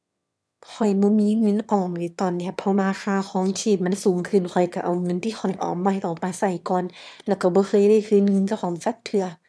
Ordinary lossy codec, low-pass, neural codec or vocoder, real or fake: none; none; autoencoder, 22.05 kHz, a latent of 192 numbers a frame, VITS, trained on one speaker; fake